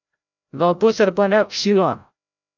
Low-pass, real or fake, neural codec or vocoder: 7.2 kHz; fake; codec, 16 kHz, 0.5 kbps, FreqCodec, larger model